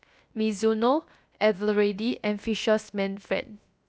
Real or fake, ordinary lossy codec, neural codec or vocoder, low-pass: fake; none; codec, 16 kHz, 0.7 kbps, FocalCodec; none